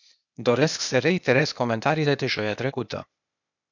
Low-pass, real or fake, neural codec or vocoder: 7.2 kHz; fake; codec, 16 kHz, 0.8 kbps, ZipCodec